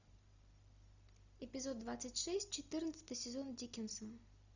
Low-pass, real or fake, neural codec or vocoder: 7.2 kHz; real; none